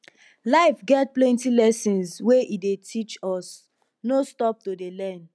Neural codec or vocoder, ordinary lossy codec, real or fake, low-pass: none; none; real; none